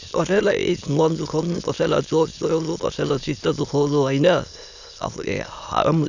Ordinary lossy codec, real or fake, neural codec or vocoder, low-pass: none; fake; autoencoder, 22.05 kHz, a latent of 192 numbers a frame, VITS, trained on many speakers; 7.2 kHz